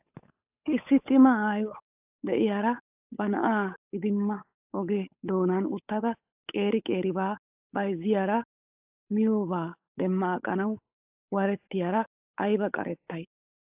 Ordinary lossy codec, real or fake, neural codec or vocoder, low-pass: AAC, 32 kbps; fake; codec, 16 kHz, 8 kbps, FunCodec, trained on Chinese and English, 25 frames a second; 3.6 kHz